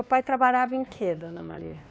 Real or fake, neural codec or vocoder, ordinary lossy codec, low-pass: fake; codec, 16 kHz, 2 kbps, X-Codec, WavLM features, trained on Multilingual LibriSpeech; none; none